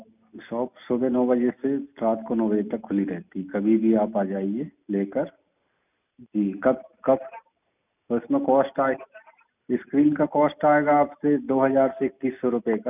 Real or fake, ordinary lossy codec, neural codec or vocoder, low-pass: real; none; none; 3.6 kHz